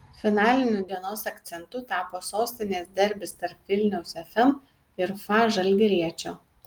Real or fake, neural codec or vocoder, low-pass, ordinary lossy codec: real; none; 19.8 kHz; Opus, 24 kbps